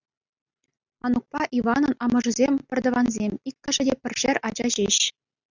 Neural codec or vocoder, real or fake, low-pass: none; real; 7.2 kHz